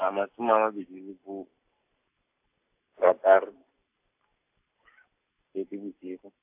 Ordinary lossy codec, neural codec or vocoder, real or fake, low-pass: AAC, 32 kbps; none; real; 3.6 kHz